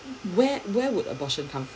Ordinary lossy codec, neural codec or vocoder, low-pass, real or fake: none; none; none; real